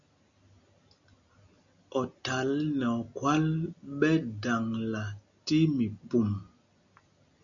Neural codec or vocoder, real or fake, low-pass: none; real; 7.2 kHz